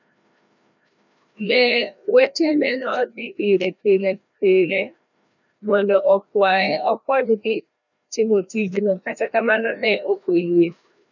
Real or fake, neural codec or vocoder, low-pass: fake; codec, 16 kHz, 1 kbps, FreqCodec, larger model; 7.2 kHz